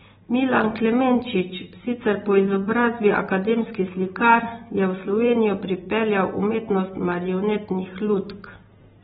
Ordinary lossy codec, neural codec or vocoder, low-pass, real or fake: AAC, 16 kbps; none; 19.8 kHz; real